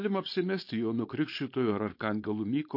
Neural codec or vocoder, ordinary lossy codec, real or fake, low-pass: codec, 16 kHz, 4.8 kbps, FACodec; MP3, 32 kbps; fake; 5.4 kHz